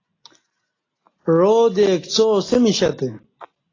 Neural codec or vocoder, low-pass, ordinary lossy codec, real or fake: none; 7.2 kHz; AAC, 32 kbps; real